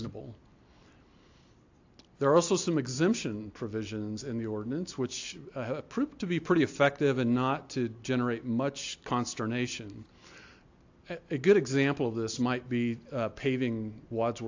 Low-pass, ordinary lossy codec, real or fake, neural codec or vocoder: 7.2 kHz; AAC, 48 kbps; real; none